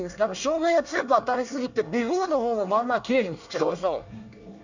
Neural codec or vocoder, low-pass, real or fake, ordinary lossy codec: codec, 24 kHz, 1 kbps, SNAC; 7.2 kHz; fake; none